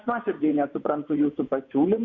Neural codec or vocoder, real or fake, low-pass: vocoder, 24 kHz, 100 mel bands, Vocos; fake; 7.2 kHz